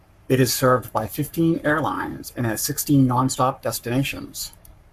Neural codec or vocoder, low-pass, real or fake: codec, 44.1 kHz, 7.8 kbps, Pupu-Codec; 14.4 kHz; fake